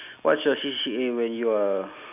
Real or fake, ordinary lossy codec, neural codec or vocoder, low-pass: real; AAC, 32 kbps; none; 3.6 kHz